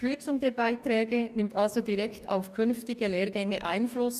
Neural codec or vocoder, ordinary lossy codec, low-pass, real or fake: codec, 44.1 kHz, 2.6 kbps, DAC; none; 14.4 kHz; fake